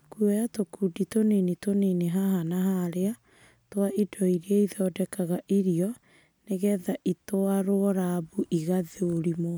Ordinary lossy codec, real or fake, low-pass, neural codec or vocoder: none; real; none; none